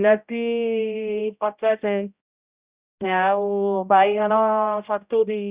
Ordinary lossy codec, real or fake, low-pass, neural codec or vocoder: Opus, 64 kbps; fake; 3.6 kHz; codec, 16 kHz, 0.5 kbps, X-Codec, HuBERT features, trained on general audio